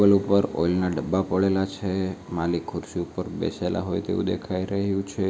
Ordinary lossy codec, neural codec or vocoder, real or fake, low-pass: none; none; real; none